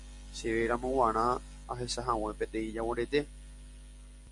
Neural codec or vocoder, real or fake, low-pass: none; real; 10.8 kHz